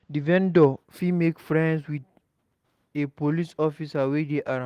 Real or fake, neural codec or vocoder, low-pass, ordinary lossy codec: real; none; 14.4 kHz; Opus, 24 kbps